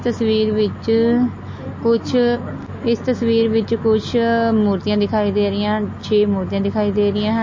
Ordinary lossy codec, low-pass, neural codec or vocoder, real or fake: MP3, 32 kbps; 7.2 kHz; none; real